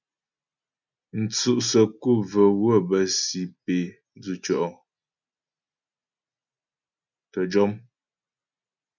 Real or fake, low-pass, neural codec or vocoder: real; 7.2 kHz; none